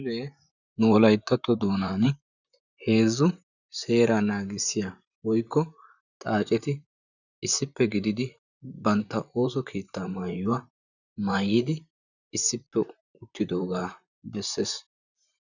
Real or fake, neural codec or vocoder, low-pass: fake; vocoder, 44.1 kHz, 128 mel bands, Pupu-Vocoder; 7.2 kHz